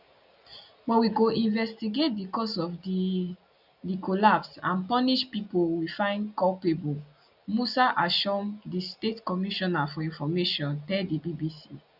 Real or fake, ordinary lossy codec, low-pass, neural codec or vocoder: real; none; 5.4 kHz; none